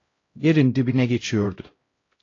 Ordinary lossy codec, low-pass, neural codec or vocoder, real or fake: AAC, 32 kbps; 7.2 kHz; codec, 16 kHz, 0.5 kbps, X-Codec, HuBERT features, trained on LibriSpeech; fake